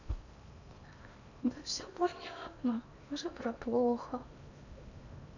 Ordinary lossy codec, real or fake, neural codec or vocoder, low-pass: none; fake; codec, 16 kHz in and 24 kHz out, 0.8 kbps, FocalCodec, streaming, 65536 codes; 7.2 kHz